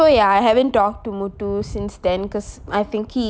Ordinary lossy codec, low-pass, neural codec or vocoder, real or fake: none; none; none; real